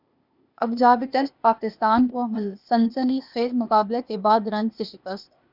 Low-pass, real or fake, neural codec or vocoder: 5.4 kHz; fake; codec, 16 kHz, 0.8 kbps, ZipCodec